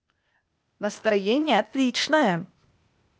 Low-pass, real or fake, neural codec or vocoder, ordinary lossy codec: none; fake; codec, 16 kHz, 0.8 kbps, ZipCodec; none